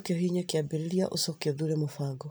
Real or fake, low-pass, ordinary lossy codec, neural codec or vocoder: fake; none; none; vocoder, 44.1 kHz, 128 mel bands every 256 samples, BigVGAN v2